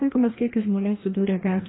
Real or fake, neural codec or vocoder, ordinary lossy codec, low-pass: fake; codec, 16 kHz, 1 kbps, FreqCodec, larger model; AAC, 16 kbps; 7.2 kHz